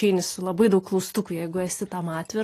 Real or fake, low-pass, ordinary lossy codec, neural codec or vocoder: real; 14.4 kHz; AAC, 48 kbps; none